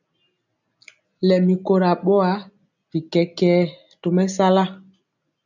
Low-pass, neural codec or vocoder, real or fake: 7.2 kHz; none; real